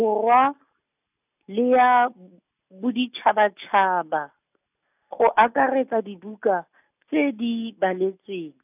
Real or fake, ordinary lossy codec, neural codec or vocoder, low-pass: real; none; none; 3.6 kHz